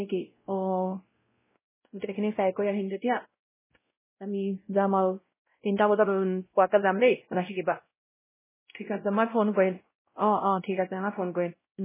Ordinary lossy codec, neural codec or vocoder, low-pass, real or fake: MP3, 16 kbps; codec, 16 kHz, 0.5 kbps, X-Codec, WavLM features, trained on Multilingual LibriSpeech; 3.6 kHz; fake